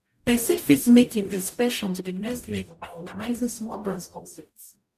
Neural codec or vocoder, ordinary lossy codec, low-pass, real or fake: codec, 44.1 kHz, 0.9 kbps, DAC; none; 14.4 kHz; fake